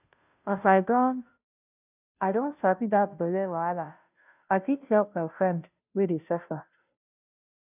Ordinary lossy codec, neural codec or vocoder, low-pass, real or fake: none; codec, 16 kHz, 0.5 kbps, FunCodec, trained on Chinese and English, 25 frames a second; 3.6 kHz; fake